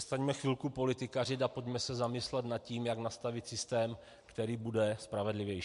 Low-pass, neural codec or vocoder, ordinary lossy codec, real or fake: 10.8 kHz; none; MP3, 64 kbps; real